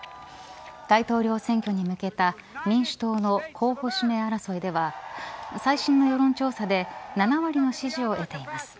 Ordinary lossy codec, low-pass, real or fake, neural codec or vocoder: none; none; real; none